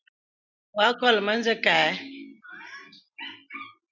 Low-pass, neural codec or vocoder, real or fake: 7.2 kHz; none; real